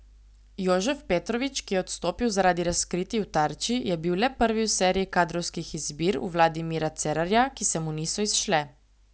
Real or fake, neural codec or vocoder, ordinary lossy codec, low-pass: real; none; none; none